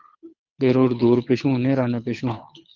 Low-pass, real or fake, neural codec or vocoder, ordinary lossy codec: 7.2 kHz; fake; codec, 24 kHz, 6 kbps, HILCodec; Opus, 24 kbps